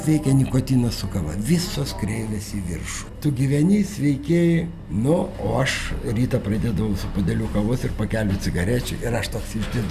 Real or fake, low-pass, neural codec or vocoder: real; 14.4 kHz; none